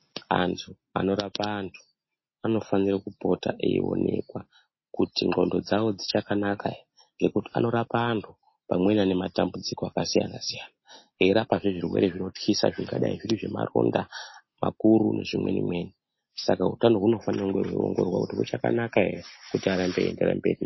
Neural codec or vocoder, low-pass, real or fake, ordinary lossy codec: none; 7.2 kHz; real; MP3, 24 kbps